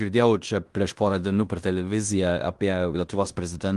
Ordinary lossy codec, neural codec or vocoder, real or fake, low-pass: Opus, 24 kbps; codec, 16 kHz in and 24 kHz out, 0.9 kbps, LongCat-Audio-Codec, fine tuned four codebook decoder; fake; 10.8 kHz